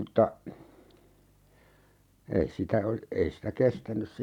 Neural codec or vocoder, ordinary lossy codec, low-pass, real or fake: vocoder, 44.1 kHz, 128 mel bands every 512 samples, BigVGAN v2; none; 19.8 kHz; fake